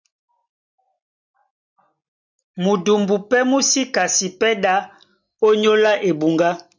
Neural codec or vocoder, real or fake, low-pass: none; real; 7.2 kHz